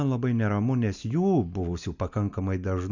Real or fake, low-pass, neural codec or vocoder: real; 7.2 kHz; none